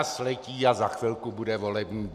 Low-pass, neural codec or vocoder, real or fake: 14.4 kHz; none; real